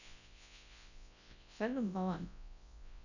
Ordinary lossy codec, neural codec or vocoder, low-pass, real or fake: none; codec, 24 kHz, 0.9 kbps, WavTokenizer, large speech release; 7.2 kHz; fake